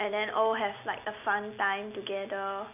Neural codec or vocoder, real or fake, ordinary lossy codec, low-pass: none; real; none; 3.6 kHz